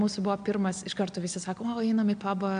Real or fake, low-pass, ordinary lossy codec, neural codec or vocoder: real; 9.9 kHz; MP3, 64 kbps; none